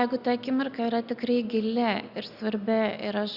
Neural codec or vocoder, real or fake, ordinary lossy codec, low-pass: none; real; Opus, 64 kbps; 5.4 kHz